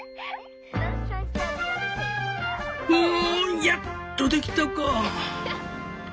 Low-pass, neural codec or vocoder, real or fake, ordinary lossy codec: none; none; real; none